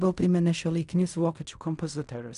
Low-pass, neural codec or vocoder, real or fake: 10.8 kHz; codec, 16 kHz in and 24 kHz out, 0.4 kbps, LongCat-Audio-Codec, fine tuned four codebook decoder; fake